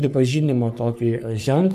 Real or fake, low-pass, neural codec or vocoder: fake; 14.4 kHz; codec, 44.1 kHz, 3.4 kbps, Pupu-Codec